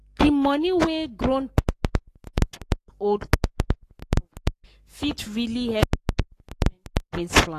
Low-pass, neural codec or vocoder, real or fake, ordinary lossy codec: 14.4 kHz; codec, 44.1 kHz, 7.8 kbps, Pupu-Codec; fake; AAC, 48 kbps